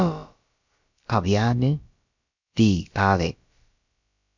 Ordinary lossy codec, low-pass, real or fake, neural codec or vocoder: MP3, 64 kbps; 7.2 kHz; fake; codec, 16 kHz, about 1 kbps, DyCAST, with the encoder's durations